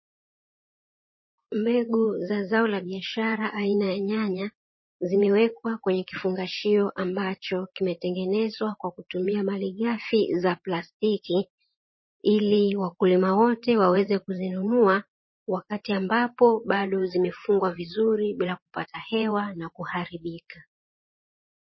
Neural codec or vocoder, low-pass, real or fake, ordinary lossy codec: vocoder, 44.1 kHz, 128 mel bands every 512 samples, BigVGAN v2; 7.2 kHz; fake; MP3, 24 kbps